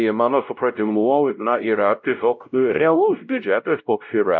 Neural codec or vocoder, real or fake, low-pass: codec, 16 kHz, 0.5 kbps, X-Codec, WavLM features, trained on Multilingual LibriSpeech; fake; 7.2 kHz